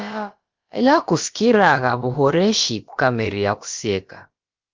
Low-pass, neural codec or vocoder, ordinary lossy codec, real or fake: 7.2 kHz; codec, 16 kHz, about 1 kbps, DyCAST, with the encoder's durations; Opus, 32 kbps; fake